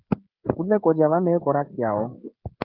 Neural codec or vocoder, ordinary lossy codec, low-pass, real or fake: codec, 16 kHz, 16 kbps, FreqCodec, smaller model; Opus, 32 kbps; 5.4 kHz; fake